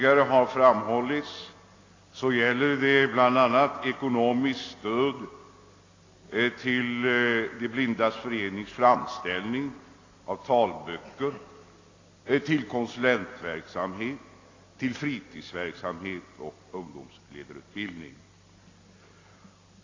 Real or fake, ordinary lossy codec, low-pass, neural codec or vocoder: real; AAC, 32 kbps; 7.2 kHz; none